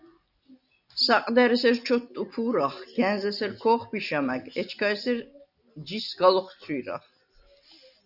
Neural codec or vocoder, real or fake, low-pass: none; real; 5.4 kHz